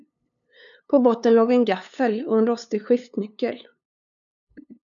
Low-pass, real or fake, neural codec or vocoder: 7.2 kHz; fake; codec, 16 kHz, 2 kbps, FunCodec, trained on LibriTTS, 25 frames a second